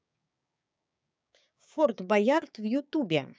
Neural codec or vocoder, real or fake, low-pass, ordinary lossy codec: codec, 16 kHz, 6 kbps, DAC; fake; none; none